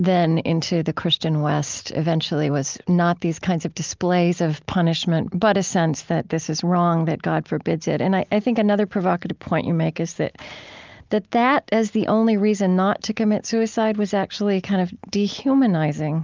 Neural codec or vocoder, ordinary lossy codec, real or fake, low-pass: none; Opus, 24 kbps; real; 7.2 kHz